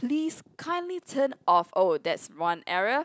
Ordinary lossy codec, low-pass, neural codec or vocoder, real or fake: none; none; none; real